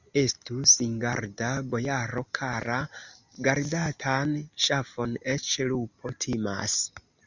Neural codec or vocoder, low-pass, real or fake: none; 7.2 kHz; real